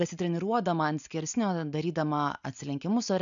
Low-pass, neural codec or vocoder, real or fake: 7.2 kHz; none; real